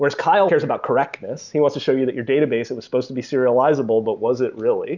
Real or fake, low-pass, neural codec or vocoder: real; 7.2 kHz; none